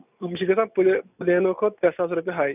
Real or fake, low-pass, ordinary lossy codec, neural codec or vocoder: real; 3.6 kHz; none; none